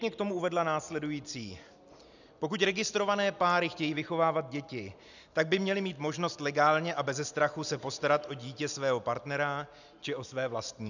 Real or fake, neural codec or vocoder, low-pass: real; none; 7.2 kHz